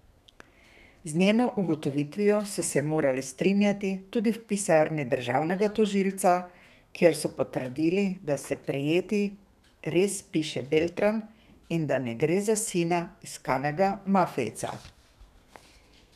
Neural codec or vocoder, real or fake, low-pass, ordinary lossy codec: codec, 32 kHz, 1.9 kbps, SNAC; fake; 14.4 kHz; none